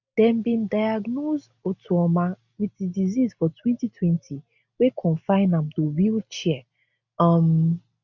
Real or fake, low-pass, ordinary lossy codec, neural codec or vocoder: real; 7.2 kHz; none; none